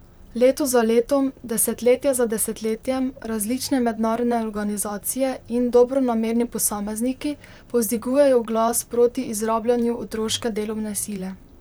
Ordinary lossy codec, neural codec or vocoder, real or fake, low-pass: none; vocoder, 44.1 kHz, 128 mel bands, Pupu-Vocoder; fake; none